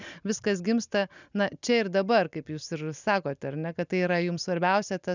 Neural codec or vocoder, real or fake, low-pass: none; real; 7.2 kHz